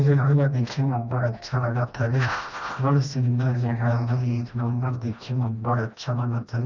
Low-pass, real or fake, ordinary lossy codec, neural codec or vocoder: 7.2 kHz; fake; none; codec, 16 kHz, 1 kbps, FreqCodec, smaller model